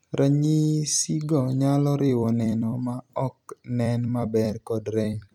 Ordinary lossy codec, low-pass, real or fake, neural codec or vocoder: none; 19.8 kHz; fake; vocoder, 44.1 kHz, 128 mel bands every 256 samples, BigVGAN v2